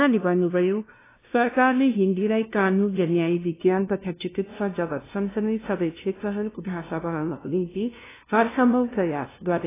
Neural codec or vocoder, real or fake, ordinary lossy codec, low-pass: codec, 16 kHz, 0.5 kbps, FunCodec, trained on LibriTTS, 25 frames a second; fake; AAC, 16 kbps; 3.6 kHz